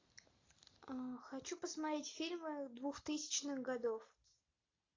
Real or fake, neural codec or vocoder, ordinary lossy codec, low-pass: real; none; AAC, 32 kbps; 7.2 kHz